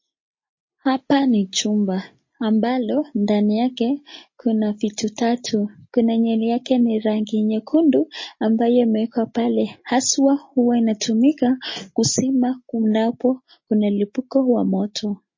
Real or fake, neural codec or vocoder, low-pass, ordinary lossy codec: real; none; 7.2 kHz; MP3, 32 kbps